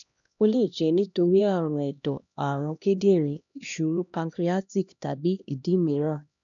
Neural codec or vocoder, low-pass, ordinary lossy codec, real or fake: codec, 16 kHz, 1 kbps, X-Codec, HuBERT features, trained on LibriSpeech; 7.2 kHz; none; fake